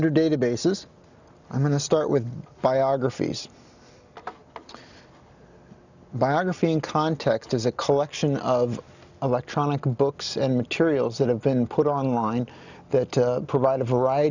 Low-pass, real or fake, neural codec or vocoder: 7.2 kHz; real; none